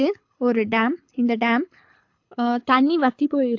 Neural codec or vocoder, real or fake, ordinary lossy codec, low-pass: codec, 24 kHz, 6 kbps, HILCodec; fake; AAC, 48 kbps; 7.2 kHz